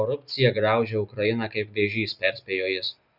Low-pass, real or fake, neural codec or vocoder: 5.4 kHz; real; none